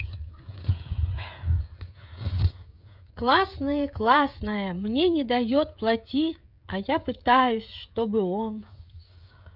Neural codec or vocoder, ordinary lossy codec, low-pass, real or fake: codec, 16 kHz, 16 kbps, FreqCodec, smaller model; none; 5.4 kHz; fake